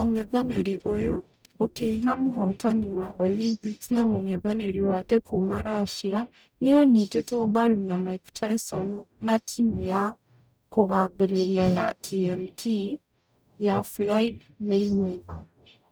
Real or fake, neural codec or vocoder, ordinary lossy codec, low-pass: fake; codec, 44.1 kHz, 0.9 kbps, DAC; none; none